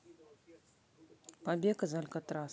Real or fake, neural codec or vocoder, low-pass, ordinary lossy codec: real; none; none; none